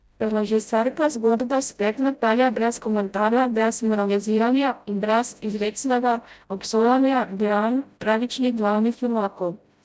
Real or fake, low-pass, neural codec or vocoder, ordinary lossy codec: fake; none; codec, 16 kHz, 0.5 kbps, FreqCodec, smaller model; none